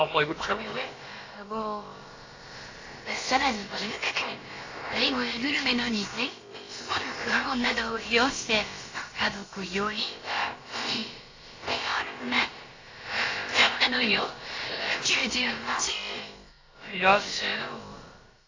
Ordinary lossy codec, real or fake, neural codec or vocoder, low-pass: AAC, 32 kbps; fake; codec, 16 kHz, about 1 kbps, DyCAST, with the encoder's durations; 7.2 kHz